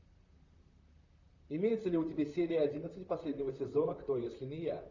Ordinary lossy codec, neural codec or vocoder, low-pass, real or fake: Opus, 64 kbps; vocoder, 44.1 kHz, 128 mel bands, Pupu-Vocoder; 7.2 kHz; fake